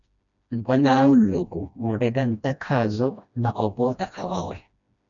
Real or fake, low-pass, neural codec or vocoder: fake; 7.2 kHz; codec, 16 kHz, 1 kbps, FreqCodec, smaller model